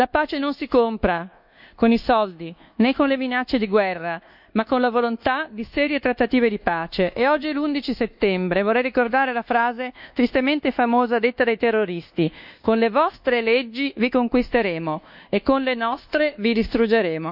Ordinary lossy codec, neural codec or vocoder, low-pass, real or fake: none; codec, 24 kHz, 1.2 kbps, DualCodec; 5.4 kHz; fake